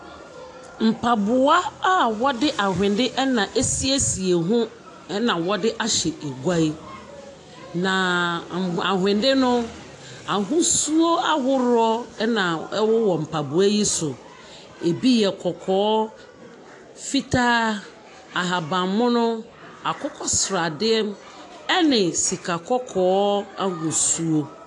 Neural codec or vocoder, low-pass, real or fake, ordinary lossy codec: none; 10.8 kHz; real; AAC, 48 kbps